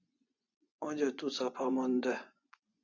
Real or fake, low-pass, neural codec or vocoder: fake; 7.2 kHz; vocoder, 24 kHz, 100 mel bands, Vocos